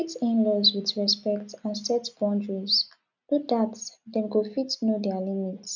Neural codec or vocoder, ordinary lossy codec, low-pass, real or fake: none; none; 7.2 kHz; real